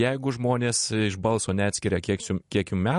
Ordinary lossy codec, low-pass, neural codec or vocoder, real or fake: MP3, 48 kbps; 14.4 kHz; none; real